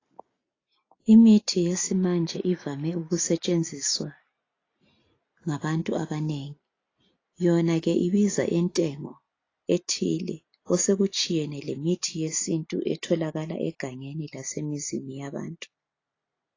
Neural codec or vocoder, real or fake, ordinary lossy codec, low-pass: none; real; AAC, 32 kbps; 7.2 kHz